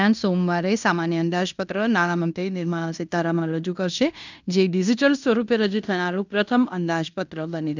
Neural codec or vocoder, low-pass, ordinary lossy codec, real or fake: codec, 16 kHz in and 24 kHz out, 0.9 kbps, LongCat-Audio-Codec, fine tuned four codebook decoder; 7.2 kHz; none; fake